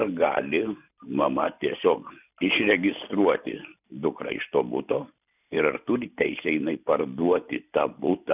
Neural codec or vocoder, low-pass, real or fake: none; 3.6 kHz; real